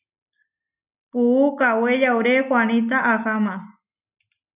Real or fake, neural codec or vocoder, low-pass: real; none; 3.6 kHz